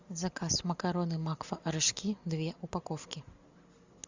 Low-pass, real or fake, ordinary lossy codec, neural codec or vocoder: 7.2 kHz; real; Opus, 64 kbps; none